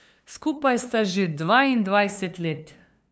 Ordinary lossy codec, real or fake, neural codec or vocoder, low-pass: none; fake; codec, 16 kHz, 2 kbps, FunCodec, trained on LibriTTS, 25 frames a second; none